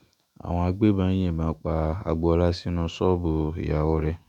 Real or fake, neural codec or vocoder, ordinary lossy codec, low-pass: fake; autoencoder, 48 kHz, 128 numbers a frame, DAC-VAE, trained on Japanese speech; none; 19.8 kHz